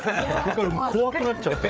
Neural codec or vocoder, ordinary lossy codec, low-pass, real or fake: codec, 16 kHz, 8 kbps, FreqCodec, larger model; none; none; fake